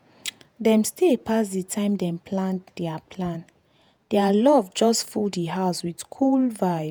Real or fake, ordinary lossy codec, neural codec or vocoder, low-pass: fake; none; vocoder, 48 kHz, 128 mel bands, Vocos; none